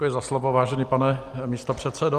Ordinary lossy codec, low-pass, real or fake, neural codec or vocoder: Opus, 32 kbps; 14.4 kHz; real; none